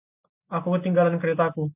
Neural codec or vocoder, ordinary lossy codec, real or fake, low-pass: none; AAC, 32 kbps; real; 3.6 kHz